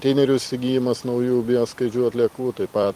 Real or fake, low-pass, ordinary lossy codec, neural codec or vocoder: fake; 14.4 kHz; Opus, 24 kbps; vocoder, 44.1 kHz, 128 mel bands every 256 samples, BigVGAN v2